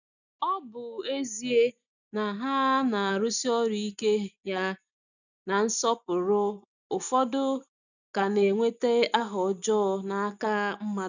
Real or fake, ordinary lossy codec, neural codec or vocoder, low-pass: real; none; none; 7.2 kHz